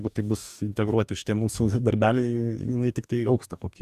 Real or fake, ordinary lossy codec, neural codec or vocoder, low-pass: fake; Opus, 64 kbps; codec, 44.1 kHz, 2.6 kbps, DAC; 14.4 kHz